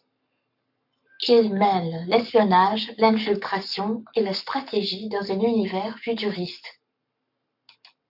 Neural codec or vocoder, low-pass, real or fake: codec, 44.1 kHz, 7.8 kbps, Pupu-Codec; 5.4 kHz; fake